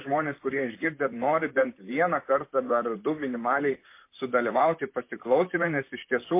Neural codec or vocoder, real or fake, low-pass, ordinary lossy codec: vocoder, 44.1 kHz, 128 mel bands, Pupu-Vocoder; fake; 3.6 kHz; MP3, 24 kbps